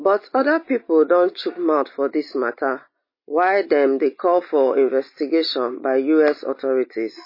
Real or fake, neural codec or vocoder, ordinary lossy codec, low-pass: real; none; MP3, 24 kbps; 5.4 kHz